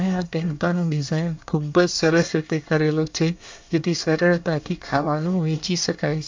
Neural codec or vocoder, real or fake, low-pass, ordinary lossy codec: codec, 24 kHz, 1 kbps, SNAC; fake; 7.2 kHz; MP3, 64 kbps